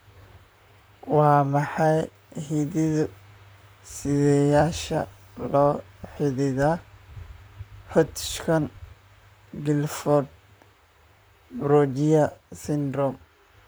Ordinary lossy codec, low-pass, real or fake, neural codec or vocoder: none; none; fake; vocoder, 44.1 kHz, 128 mel bands, Pupu-Vocoder